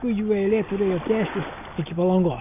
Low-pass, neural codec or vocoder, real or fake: 3.6 kHz; none; real